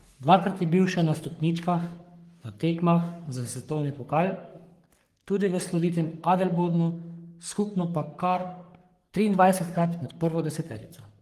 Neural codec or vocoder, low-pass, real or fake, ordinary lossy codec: codec, 44.1 kHz, 3.4 kbps, Pupu-Codec; 14.4 kHz; fake; Opus, 24 kbps